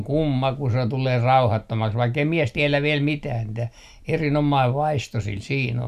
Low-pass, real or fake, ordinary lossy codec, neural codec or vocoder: 14.4 kHz; real; none; none